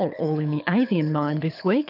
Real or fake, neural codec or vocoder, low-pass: fake; codec, 24 kHz, 6 kbps, HILCodec; 5.4 kHz